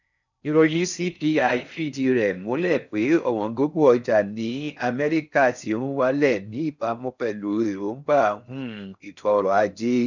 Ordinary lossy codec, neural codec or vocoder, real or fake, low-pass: none; codec, 16 kHz in and 24 kHz out, 0.6 kbps, FocalCodec, streaming, 4096 codes; fake; 7.2 kHz